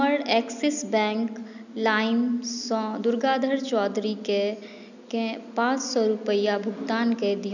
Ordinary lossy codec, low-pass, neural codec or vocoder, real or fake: none; 7.2 kHz; none; real